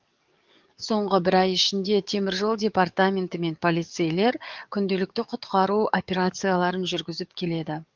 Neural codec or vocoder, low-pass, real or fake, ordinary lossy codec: codec, 44.1 kHz, 7.8 kbps, DAC; 7.2 kHz; fake; Opus, 24 kbps